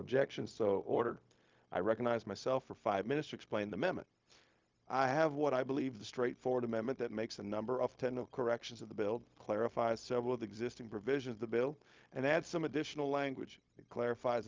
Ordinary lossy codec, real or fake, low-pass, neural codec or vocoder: Opus, 24 kbps; fake; 7.2 kHz; codec, 16 kHz, 0.4 kbps, LongCat-Audio-Codec